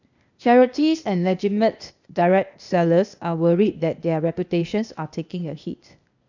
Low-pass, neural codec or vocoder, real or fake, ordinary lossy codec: 7.2 kHz; codec, 16 kHz, 0.8 kbps, ZipCodec; fake; none